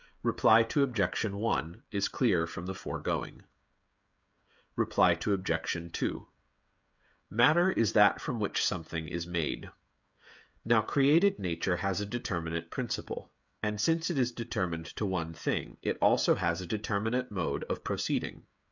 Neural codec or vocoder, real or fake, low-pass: codec, 16 kHz, 16 kbps, FreqCodec, smaller model; fake; 7.2 kHz